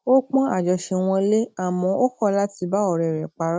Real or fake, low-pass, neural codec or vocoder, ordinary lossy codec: real; none; none; none